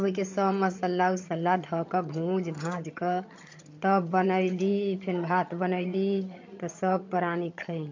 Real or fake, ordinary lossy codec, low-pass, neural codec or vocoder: fake; MP3, 48 kbps; 7.2 kHz; vocoder, 22.05 kHz, 80 mel bands, HiFi-GAN